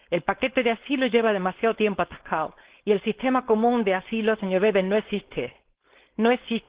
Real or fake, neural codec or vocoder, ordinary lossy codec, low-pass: fake; codec, 16 kHz, 4.8 kbps, FACodec; Opus, 16 kbps; 3.6 kHz